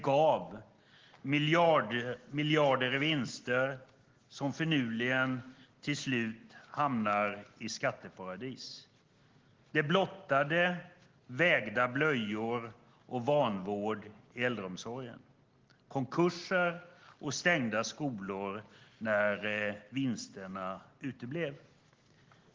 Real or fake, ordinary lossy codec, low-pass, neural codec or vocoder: real; Opus, 16 kbps; 7.2 kHz; none